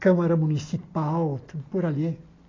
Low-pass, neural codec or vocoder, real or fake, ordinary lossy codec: 7.2 kHz; none; real; AAC, 32 kbps